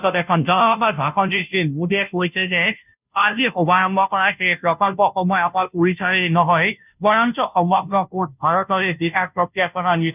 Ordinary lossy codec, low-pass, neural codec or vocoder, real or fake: none; 3.6 kHz; codec, 16 kHz, 0.5 kbps, FunCodec, trained on Chinese and English, 25 frames a second; fake